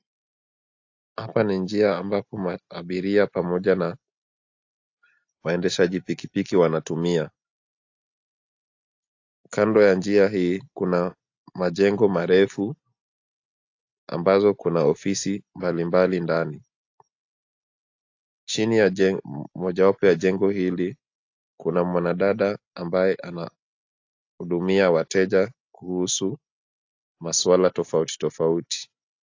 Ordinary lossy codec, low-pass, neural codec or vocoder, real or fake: AAC, 48 kbps; 7.2 kHz; none; real